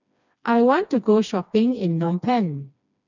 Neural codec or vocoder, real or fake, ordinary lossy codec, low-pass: codec, 16 kHz, 2 kbps, FreqCodec, smaller model; fake; none; 7.2 kHz